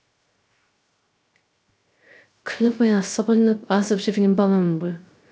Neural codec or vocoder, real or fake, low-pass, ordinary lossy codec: codec, 16 kHz, 0.3 kbps, FocalCodec; fake; none; none